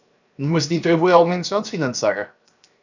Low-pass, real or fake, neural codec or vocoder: 7.2 kHz; fake; codec, 16 kHz, 0.7 kbps, FocalCodec